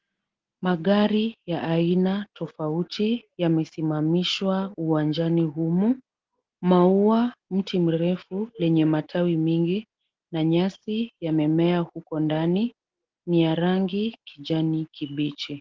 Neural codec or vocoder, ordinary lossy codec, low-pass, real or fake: none; Opus, 16 kbps; 7.2 kHz; real